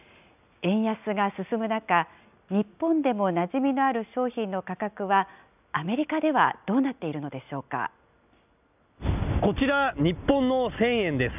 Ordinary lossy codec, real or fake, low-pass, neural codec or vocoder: none; real; 3.6 kHz; none